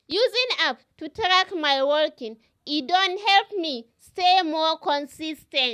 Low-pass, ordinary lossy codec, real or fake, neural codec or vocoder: 14.4 kHz; none; real; none